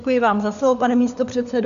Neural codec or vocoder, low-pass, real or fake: codec, 16 kHz, 4 kbps, FunCodec, trained on LibriTTS, 50 frames a second; 7.2 kHz; fake